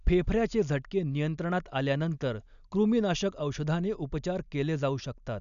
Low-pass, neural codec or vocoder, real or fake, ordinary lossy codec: 7.2 kHz; none; real; none